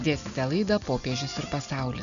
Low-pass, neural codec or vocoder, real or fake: 7.2 kHz; none; real